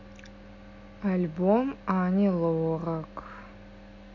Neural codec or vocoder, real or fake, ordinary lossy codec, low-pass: none; real; MP3, 64 kbps; 7.2 kHz